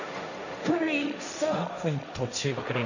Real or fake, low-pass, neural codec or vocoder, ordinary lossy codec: fake; 7.2 kHz; codec, 16 kHz, 1.1 kbps, Voila-Tokenizer; none